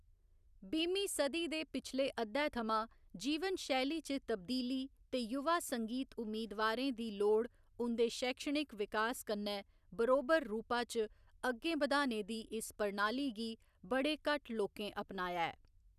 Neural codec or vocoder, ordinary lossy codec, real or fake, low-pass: none; none; real; 14.4 kHz